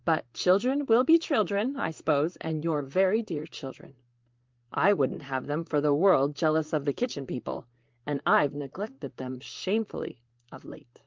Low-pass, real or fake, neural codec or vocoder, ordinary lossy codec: 7.2 kHz; fake; vocoder, 44.1 kHz, 128 mel bands, Pupu-Vocoder; Opus, 24 kbps